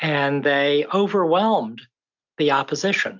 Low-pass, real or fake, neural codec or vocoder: 7.2 kHz; real; none